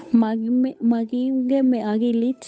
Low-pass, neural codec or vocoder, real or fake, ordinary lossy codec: none; codec, 16 kHz, 8 kbps, FunCodec, trained on Chinese and English, 25 frames a second; fake; none